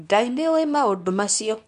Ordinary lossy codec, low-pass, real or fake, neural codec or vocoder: none; 10.8 kHz; fake; codec, 24 kHz, 0.9 kbps, WavTokenizer, medium speech release version 2